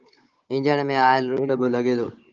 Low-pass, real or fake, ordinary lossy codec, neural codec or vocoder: 7.2 kHz; fake; Opus, 24 kbps; codec, 16 kHz, 16 kbps, FunCodec, trained on Chinese and English, 50 frames a second